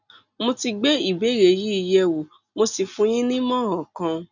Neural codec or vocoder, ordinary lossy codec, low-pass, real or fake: none; none; 7.2 kHz; real